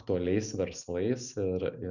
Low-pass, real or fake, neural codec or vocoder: 7.2 kHz; real; none